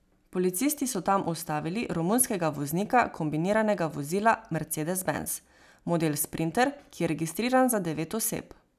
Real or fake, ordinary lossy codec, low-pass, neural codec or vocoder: real; none; 14.4 kHz; none